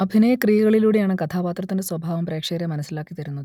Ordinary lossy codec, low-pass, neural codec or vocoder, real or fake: none; 19.8 kHz; none; real